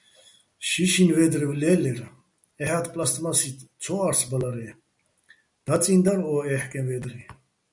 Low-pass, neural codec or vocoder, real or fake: 10.8 kHz; none; real